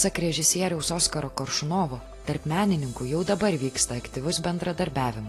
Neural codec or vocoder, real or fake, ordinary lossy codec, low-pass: none; real; AAC, 48 kbps; 14.4 kHz